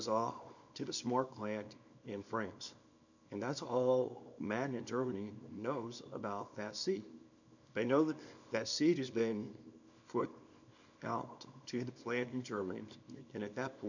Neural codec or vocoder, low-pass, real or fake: codec, 24 kHz, 0.9 kbps, WavTokenizer, small release; 7.2 kHz; fake